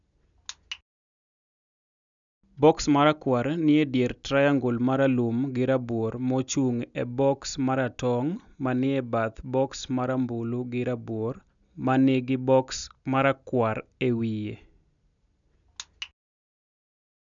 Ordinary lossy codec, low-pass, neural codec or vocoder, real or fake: none; 7.2 kHz; none; real